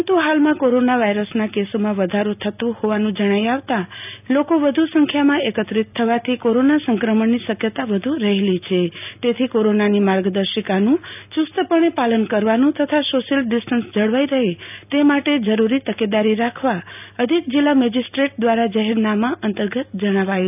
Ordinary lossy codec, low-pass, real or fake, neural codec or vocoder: none; 3.6 kHz; real; none